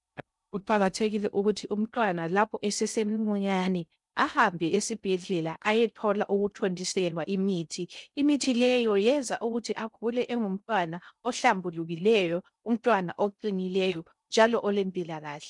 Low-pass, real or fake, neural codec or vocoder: 10.8 kHz; fake; codec, 16 kHz in and 24 kHz out, 0.6 kbps, FocalCodec, streaming, 2048 codes